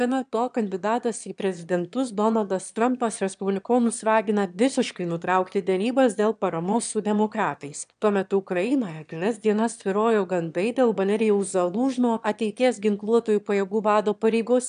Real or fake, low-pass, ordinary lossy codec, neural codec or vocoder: fake; 9.9 kHz; AAC, 96 kbps; autoencoder, 22.05 kHz, a latent of 192 numbers a frame, VITS, trained on one speaker